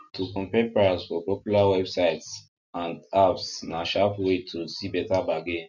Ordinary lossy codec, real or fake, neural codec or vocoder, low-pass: none; real; none; 7.2 kHz